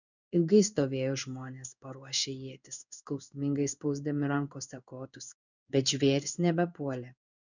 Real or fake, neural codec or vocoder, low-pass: fake; codec, 16 kHz in and 24 kHz out, 1 kbps, XY-Tokenizer; 7.2 kHz